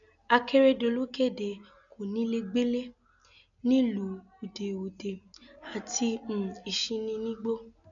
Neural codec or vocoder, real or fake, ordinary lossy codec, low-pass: none; real; none; 7.2 kHz